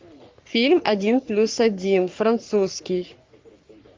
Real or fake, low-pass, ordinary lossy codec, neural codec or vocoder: fake; 7.2 kHz; Opus, 32 kbps; codec, 44.1 kHz, 3.4 kbps, Pupu-Codec